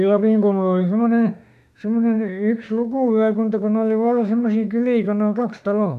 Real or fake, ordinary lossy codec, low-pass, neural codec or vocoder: fake; none; 14.4 kHz; autoencoder, 48 kHz, 32 numbers a frame, DAC-VAE, trained on Japanese speech